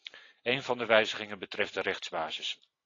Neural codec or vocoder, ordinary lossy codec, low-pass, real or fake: none; AAC, 32 kbps; 7.2 kHz; real